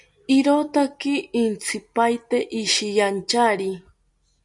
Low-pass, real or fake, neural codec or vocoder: 10.8 kHz; real; none